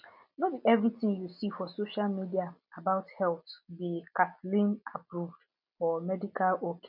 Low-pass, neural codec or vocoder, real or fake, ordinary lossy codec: 5.4 kHz; none; real; none